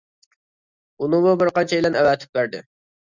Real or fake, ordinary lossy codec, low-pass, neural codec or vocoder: real; Opus, 64 kbps; 7.2 kHz; none